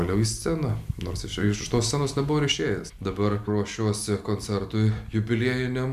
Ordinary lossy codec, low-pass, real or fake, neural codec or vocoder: MP3, 96 kbps; 14.4 kHz; real; none